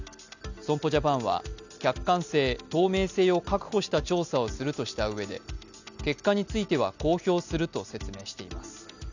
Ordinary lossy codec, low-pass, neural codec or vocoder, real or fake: none; 7.2 kHz; none; real